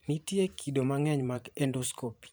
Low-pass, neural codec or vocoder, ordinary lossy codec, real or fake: none; vocoder, 44.1 kHz, 128 mel bands every 512 samples, BigVGAN v2; none; fake